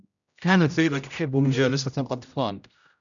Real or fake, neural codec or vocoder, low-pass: fake; codec, 16 kHz, 0.5 kbps, X-Codec, HuBERT features, trained on general audio; 7.2 kHz